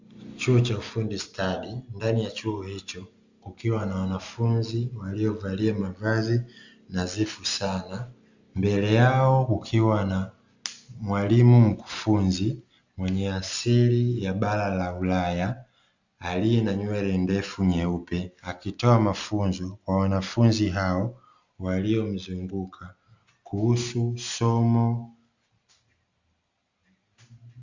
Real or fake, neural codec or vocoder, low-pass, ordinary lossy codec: real; none; 7.2 kHz; Opus, 64 kbps